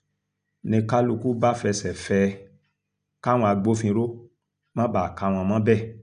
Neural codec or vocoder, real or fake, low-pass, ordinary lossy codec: none; real; 9.9 kHz; none